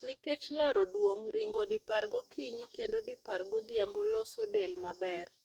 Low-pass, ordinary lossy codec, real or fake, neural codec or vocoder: 19.8 kHz; Opus, 64 kbps; fake; codec, 44.1 kHz, 2.6 kbps, DAC